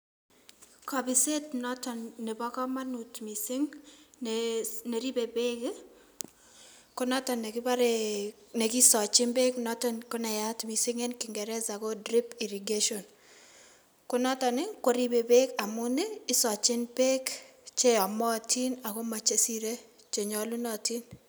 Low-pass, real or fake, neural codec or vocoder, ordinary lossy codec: none; real; none; none